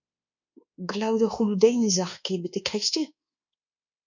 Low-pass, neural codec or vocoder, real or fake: 7.2 kHz; codec, 24 kHz, 1.2 kbps, DualCodec; fake